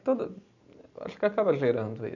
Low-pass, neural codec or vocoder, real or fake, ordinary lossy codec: 7.2 kHz; none; real; none